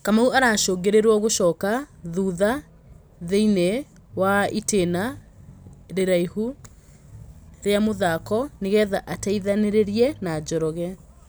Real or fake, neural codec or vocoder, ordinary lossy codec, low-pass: real; none; none; none